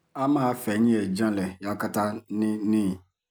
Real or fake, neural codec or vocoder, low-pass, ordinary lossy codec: real; none; none; none